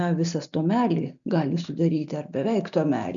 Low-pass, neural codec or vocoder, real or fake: 7.2 kHz; none; real